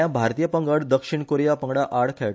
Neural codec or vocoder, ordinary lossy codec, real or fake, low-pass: none; none; real; none